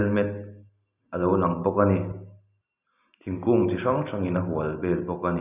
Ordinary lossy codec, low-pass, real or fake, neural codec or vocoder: none; 3.6 kHz; real; none